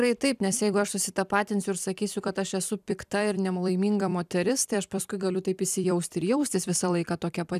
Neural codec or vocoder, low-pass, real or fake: vocoder, 44.1 kHz, 128 mel bands every 256 samples, BigVGAN v2; 14.4 kHz; fake